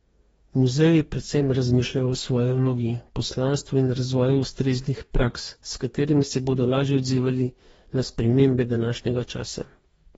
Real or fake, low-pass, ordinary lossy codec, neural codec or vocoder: fake; 19.8 kHz; AAC, 24 kbps; codec, 44.1 kHz, 2.6 kbps, DAC